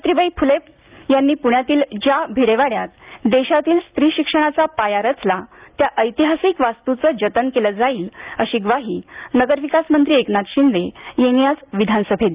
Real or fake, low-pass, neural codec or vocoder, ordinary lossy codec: real; 3.6 kHz; none; Opus, 24 kbps